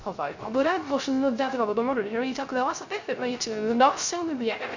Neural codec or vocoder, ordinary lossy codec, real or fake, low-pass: codec, 16 kHz, 0.3 kbps, FocalCodec; none; fake; 7.2 kHz